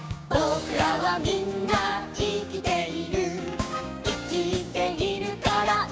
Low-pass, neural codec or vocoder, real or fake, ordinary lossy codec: none; codec, 16 kHz, 6 kbps, DAC; fake; none